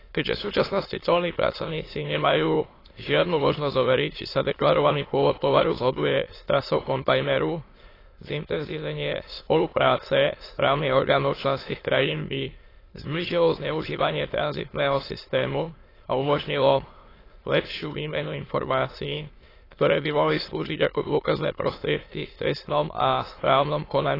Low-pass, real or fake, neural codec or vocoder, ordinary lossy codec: 5.4 kHz; fake; autoencoder, 22.05 kHz, a latent of 192 numbers a frame, VITS, trained on many speakers; AAC, 24 kbps